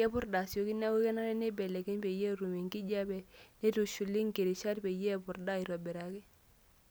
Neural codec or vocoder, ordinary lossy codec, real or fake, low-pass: none; none; real; none